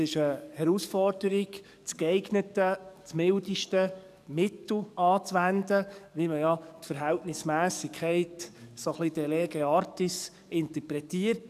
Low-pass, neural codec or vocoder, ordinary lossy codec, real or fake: 14.4 kHz; codec, 44.1 kHz, 7.8 kbps, DAC; none; fake